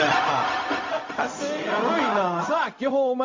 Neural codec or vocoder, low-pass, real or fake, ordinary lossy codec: codec, 16 kHz, 0.4 kbps, LongCat-Audio-Codec; 7.2 kHz; fake; MP3, 32 kbps